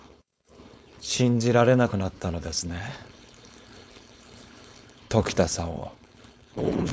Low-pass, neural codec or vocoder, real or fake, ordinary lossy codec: none; codec, 16 kHz, 4.8 kbps, FACodec; fake; none